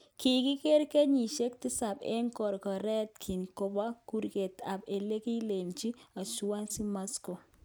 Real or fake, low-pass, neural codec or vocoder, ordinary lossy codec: real; none; none; none